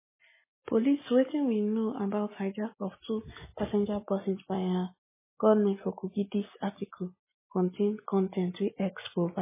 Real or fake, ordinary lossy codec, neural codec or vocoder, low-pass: real; MP3, 16 kbps; none; 3.6 kHz